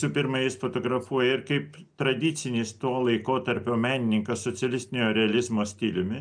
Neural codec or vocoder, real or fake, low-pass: none; real; 9.9 kHz